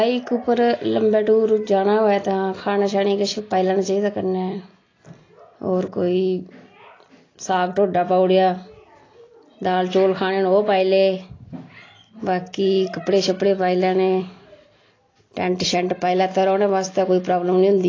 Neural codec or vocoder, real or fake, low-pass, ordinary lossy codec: none; real; 7.2 kHz; AAC, 32 kbps